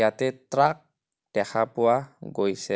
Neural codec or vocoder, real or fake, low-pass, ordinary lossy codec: none; real; none; none